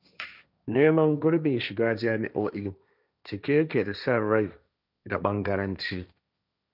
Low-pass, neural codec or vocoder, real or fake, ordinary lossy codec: 5.4 kHz; codec, 16 kHz, 1.1 kbps, Voila-Tokenizer; fake; none